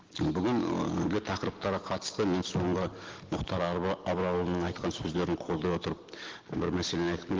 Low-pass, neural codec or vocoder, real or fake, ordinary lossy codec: 7.2 kHz; none; real; Opus, 16 kbps